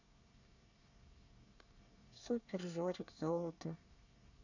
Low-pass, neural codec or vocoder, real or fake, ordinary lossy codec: 7.2 kHz; codec, 24 kHz, 1 kbps, SNAC; fake; none